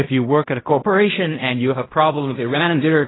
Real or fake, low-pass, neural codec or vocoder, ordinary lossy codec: fake; 7.2 kHz; codec, 16 kHz in and 24 kHz out, 0.4 kbps, LongCat-Audio-Codec, fine tuned four codebook decoder; AAC, 16 kbps